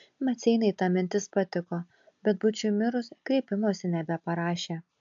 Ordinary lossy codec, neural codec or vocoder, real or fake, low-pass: AAC, 64 kbps; none; real; 7.2 kHz